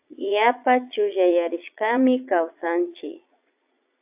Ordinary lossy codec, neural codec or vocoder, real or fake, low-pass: AAC, 32 kbps; vocoder, 22.05 kHz, 80 mel bands, Vocos; fake; 3.6 kHz